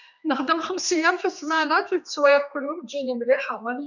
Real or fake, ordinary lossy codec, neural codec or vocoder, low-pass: fake; none; codec, 16 kHz, 2 kbps, X-Codec, HuBERT features, trained on general audio; 7.2 kHz